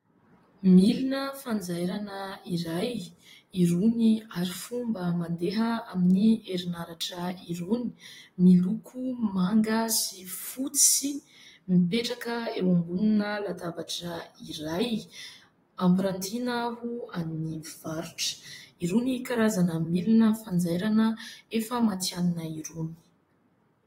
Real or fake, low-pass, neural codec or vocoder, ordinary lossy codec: fake; 19.8 kHz; vocoder, 44.1 kHz, 128 mel bands, Pupu-Vocoder; AAC, 48 kbps